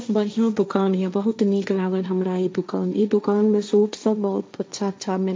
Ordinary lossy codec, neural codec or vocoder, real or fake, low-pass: none; codec, 16 kHz, 1.1 kbps, Voila-Tokenizer; fake; none